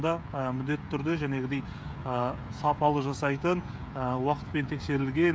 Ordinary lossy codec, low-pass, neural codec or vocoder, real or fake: none; none; codec, 16 kHz, 16 kbps, FreqCodec, smaller model; fake